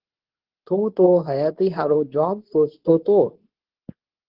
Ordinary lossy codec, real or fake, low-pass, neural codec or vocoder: Opus, 16 kbps; fake; 5.4 kHz; codec, 24 kHz, 0.9 kbps, WavTokenizer, medium speech release version 2